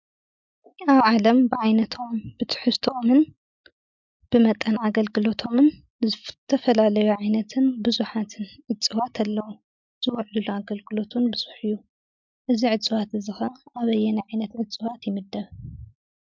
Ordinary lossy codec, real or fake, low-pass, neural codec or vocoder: MP3, 64 kbps; real; 7.2 kHz; none